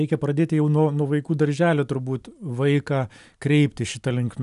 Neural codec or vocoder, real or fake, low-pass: none; real; 10.8 kHz